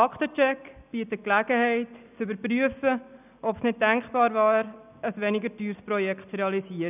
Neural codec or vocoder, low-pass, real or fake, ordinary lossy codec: none; 3.6 kHz; real; none